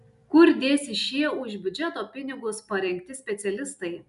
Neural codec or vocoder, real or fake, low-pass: none; real; 10.8 kHz